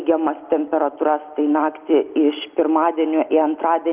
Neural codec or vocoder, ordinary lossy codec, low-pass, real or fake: none; Opus, 32 kbps; 3.6 kHz; real